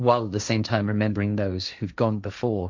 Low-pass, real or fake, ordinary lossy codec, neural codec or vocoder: 7.2 kHz; fake; MP3, 64 kbps; codec, 16 kHz, 1.1 kbps, Voila-Tokenizer